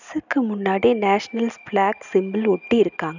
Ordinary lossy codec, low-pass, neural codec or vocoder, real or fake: none; 7.2 kHz; none; real